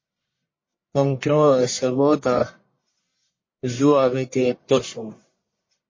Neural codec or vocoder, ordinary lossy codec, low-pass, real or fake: codec, 44.1 kHz, 1.7 kbps, Pupu-Codec; MP3, 32 kbps; 7.2 kHz; fake